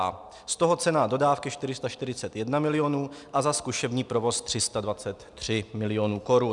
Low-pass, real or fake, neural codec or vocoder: 10.8 kHz; real; none